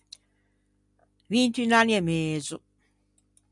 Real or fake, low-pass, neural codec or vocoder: real; 10.8 kHz; none